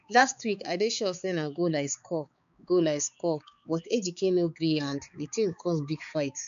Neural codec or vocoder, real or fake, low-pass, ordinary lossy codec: codec, 16 kHz, 4 kbps, X-Codec, HuBERT features, trained on balanced general audio; fake; 7.2 kHz; MP3, 96 kbps